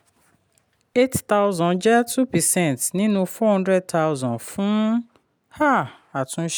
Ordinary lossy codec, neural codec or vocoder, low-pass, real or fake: none; none; none; real